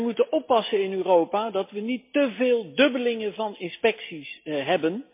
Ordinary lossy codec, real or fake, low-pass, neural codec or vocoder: MP3, 24 kbps; real; 3.6 kHz; none